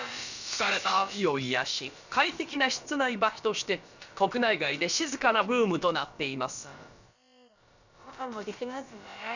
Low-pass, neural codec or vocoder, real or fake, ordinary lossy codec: 7.2 kHz; codec, 16 kHz, about 1 kbps, DyCAST, with the encoder's durations; fake; none